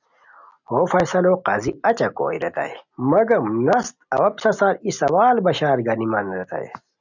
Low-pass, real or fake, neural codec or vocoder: 7.2 kHz; real; none